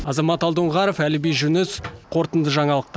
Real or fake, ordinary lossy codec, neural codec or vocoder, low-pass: real; none; none; none